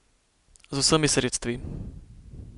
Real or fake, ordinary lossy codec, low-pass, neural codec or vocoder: real; none; 10.8 kHz; none